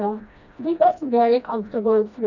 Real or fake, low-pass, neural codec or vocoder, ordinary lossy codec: fake; 7.2 kHz; codec, 16 kHz, 1 kbps, FreqCodec, smaller model; none